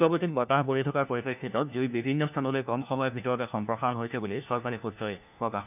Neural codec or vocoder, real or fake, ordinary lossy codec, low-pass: codec, 16 kHz, 1 kbps, FunCodec, trained on LibriTTS, 50 frames a second; fake; none; 3.6 kHz